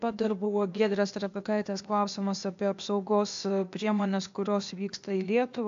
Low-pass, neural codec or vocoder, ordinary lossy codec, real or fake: 7.2 kHz; codec, 16 kHz, 0.8 kbps, ZipCodec; AAC, 64 kbps; fake